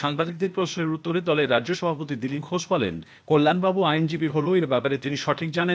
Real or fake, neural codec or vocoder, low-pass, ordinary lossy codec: fake; codec, 16 kHz, 0.8 kbps, ZipCodec; none; none